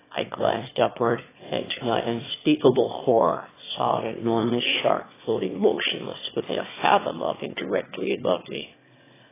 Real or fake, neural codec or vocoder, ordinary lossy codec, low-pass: fake; autoencoder, 22.05 kHz, a latent of 192 numbers a frame, VITS, trained on one speaker; AAC, 16 kbps; 3.6 kHz